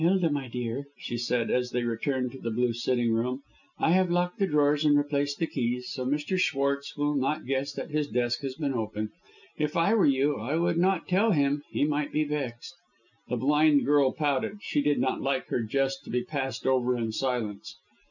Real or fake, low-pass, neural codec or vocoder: real; 7.2 kHz; none